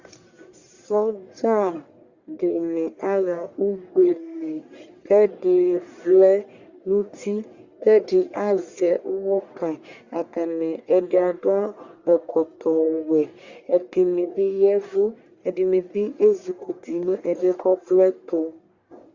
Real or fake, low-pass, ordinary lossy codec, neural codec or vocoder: fake; 7.2 kHz; Opus, 64 kbps; codec, 44.1 kHz, 1.7 kbps, Pupu-Codec